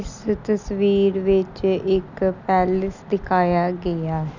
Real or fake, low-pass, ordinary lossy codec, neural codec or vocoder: real; 7.2 kHz; none; none